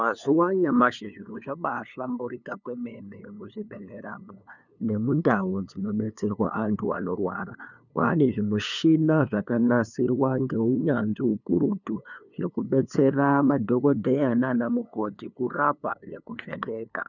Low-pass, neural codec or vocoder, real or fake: 7.2 kHz; codec, 16 kHz, 2 kbps, FunCodec, trained on LibriTTS, 25 frames a second; fake